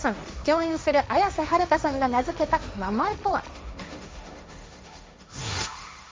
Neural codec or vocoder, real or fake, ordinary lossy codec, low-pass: codec, 16 kHz, 1.1 kbps, Voila-Tokenizer; fake; none; none